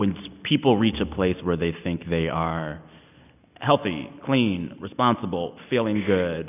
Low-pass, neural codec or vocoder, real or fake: 3.6 kHz; none; real